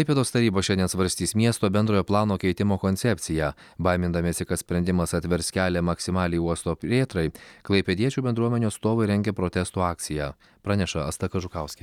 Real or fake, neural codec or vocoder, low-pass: real; none; 19.8 kHz